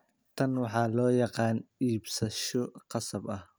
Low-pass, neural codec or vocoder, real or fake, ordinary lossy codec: none; none; real; none